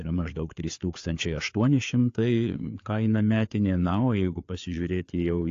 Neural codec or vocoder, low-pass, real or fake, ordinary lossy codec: codec, 16 kHz, 4 kbps, FreqCodec, larger model; 7.2 kHz; fake; AAC, 48 kbps